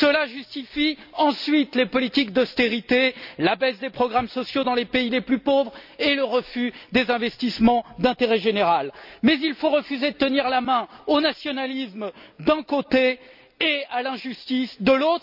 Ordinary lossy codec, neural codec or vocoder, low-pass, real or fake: none; none; 5.4 kHz; real